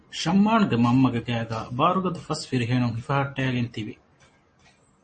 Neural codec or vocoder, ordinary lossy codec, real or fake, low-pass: none; MP3, 32 kbps; real; 10.8 kHz